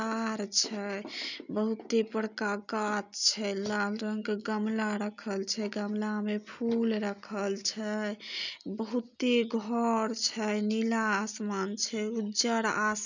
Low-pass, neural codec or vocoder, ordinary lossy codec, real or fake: 7.2 kHz; none; none; real